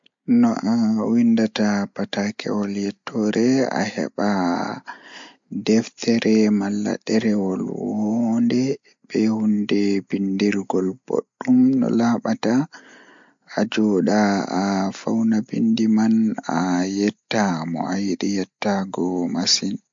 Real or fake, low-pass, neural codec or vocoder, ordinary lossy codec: real; 7.2 kHz; none; MP3, 48 kbps